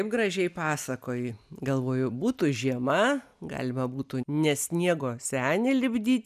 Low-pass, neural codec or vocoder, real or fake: 14.4 kHz; none; real